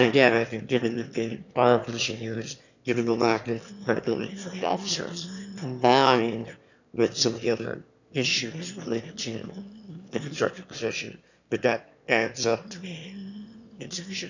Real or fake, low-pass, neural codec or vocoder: fake; 7.2 kHz; autoencoder, 22.05 kHz, a latent of 192 numbers a frame, VITS, trained on one speaker